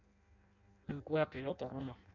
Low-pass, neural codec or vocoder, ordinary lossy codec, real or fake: 7.2 kHz; codec, 16 kHz in and 24 kHz out, 0.6 kbps, FireRedTTS-2 codec; none; fake